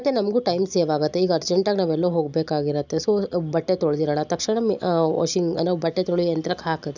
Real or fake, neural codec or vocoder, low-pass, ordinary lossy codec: real; none; 7.2 kHz; none